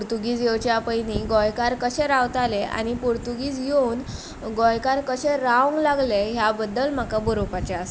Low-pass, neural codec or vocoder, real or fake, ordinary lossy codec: none; none; real; none